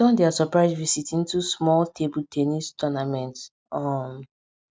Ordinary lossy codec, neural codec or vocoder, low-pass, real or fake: none; none; none; real